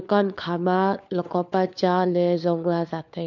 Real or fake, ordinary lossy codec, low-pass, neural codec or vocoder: fake; none; 7.2 kHz; codec, 16 kHz, 4 kbps, FunCodec, trained on LibriTTS, 50 frames a second